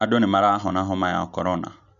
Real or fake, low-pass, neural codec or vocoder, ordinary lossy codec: real; 7.2 kHz; none; none